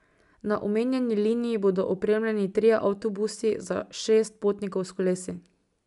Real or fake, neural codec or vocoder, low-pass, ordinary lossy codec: real; none; 10.8 kHz; none